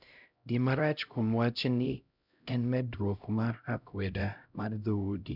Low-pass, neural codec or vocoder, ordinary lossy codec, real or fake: 5.4 kHz; codec, 16 kHz, 0.5 kbps, X-Codec, HuBERT features, trained on LibriSpeech; none; fake